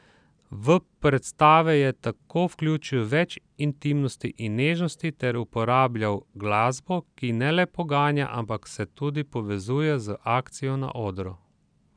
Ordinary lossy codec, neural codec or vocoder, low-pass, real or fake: none; none; 9.9 kHz; real